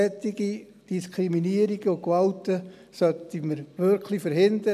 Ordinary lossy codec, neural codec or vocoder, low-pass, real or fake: none; none; 14.4 kHz; real